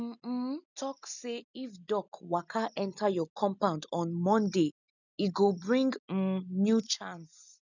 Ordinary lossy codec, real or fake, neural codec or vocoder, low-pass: none; real; none; 7.2 kHz